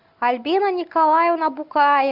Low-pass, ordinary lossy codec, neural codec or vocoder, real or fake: 5.4 kHz; Opus, 64 kbps; none; real